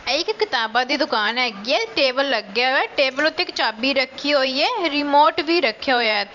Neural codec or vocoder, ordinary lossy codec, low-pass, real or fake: vocoder, 44.1 kHz, 128 mel bands every 512 samples, BigVGAN v2; none; 7.2 kHz; fake